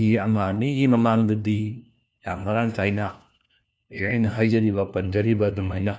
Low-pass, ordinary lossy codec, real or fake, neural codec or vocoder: none; none; fake; codec, 16 kHz, 1 kbps, FunCodec, trained on LibriTTS, 50 frames a second